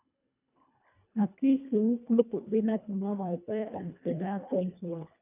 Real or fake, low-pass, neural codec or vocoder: fake; 3.6 kHz; codec, 24 kHz, 1.5 kbps, HILCodec